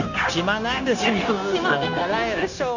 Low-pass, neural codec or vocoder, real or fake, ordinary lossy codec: 7.2 kHz; codec, 16 kHz, 0.9 kbps, LongCat-Audio-Codec; fake; none